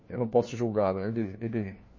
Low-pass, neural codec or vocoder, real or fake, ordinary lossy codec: 7.2 kHz; codec, 16 kHz, 1 kbps, FunCodec, trained on LibriTTS, 50 frames a second; fake; MP3, 32 kbps